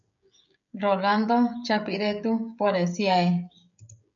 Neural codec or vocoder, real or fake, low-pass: codec, 16 kHz, 16 kbps, FreqCodec, smaller model; fake; 7.2 kHz